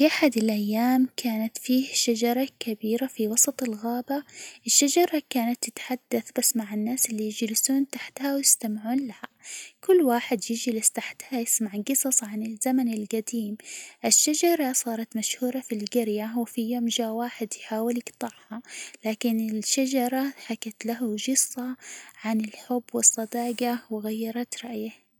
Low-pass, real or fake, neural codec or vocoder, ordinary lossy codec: none; real; none; none